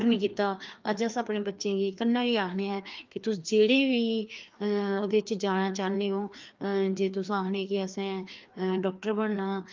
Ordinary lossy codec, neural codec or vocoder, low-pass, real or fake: Opus, 24 kbps; codec, 16 kHz, 2 kbps, FreqCodec, larger model; 7.2 kHz; fake